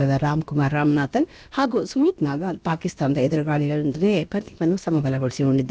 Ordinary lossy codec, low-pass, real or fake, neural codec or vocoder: none; none; fake; codec, 16 kHz, about 1 kbps, DyCAST, with the encoder's durations